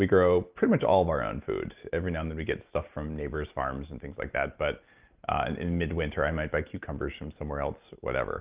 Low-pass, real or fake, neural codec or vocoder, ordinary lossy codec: 3.6 kHz; real; none; Opus, 24 kbps